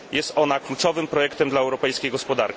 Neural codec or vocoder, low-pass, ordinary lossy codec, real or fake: none; none; none; real